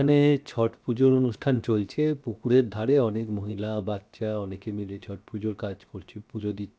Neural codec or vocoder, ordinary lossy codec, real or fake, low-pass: codec, 16 kHz, 0.7 kbps, FocalCodec; none; fake; none